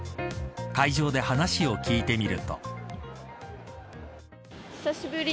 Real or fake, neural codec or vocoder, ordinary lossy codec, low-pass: real; none; none; none